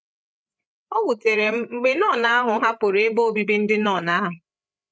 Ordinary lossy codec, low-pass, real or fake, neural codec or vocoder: none; none; fake; codec, 16 kHz, 8 kbps, FreqCodec, larger model